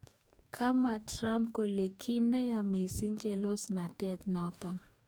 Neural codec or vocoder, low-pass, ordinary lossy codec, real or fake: codec, 44.1 kHz, 2.6 kbps, DAC; none; none; fake